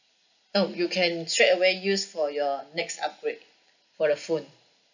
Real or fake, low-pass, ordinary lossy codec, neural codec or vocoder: real; 7.2 kHz; none; none